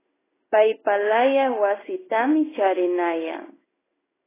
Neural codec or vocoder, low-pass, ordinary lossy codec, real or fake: codec, 16 kHz in and 24 kHz out, 1 kbps, XY-Tokenizer; 3.6 kHz; AAC, 16 kbps; fake